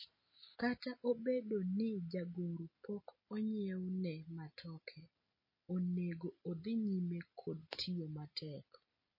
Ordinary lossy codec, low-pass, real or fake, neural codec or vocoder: MP3, 24 kbps; 5.4 kHz; real; none